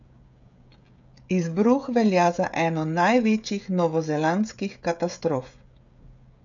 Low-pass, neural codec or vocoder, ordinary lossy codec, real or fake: 7.2 kHz; codec, 16 kHz, 16 kbps, FreqCodec, smaller model; none; fake